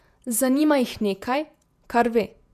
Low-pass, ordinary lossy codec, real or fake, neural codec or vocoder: 14.4 kHz; none; fake; vocoder, 48 kHz, 128 mel bands, Vocos